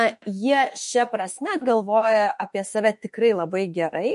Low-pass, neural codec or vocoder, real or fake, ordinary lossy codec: 14.4 kHz; autoencoder, 48 kHz, 32 numbers a frame, DAC-VAE, trained on Japanese speech; fake; MP3, 48 kbps